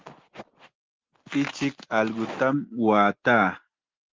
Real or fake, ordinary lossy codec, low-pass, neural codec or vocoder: real; Opus, 16 kbps; 7.2 kHz; none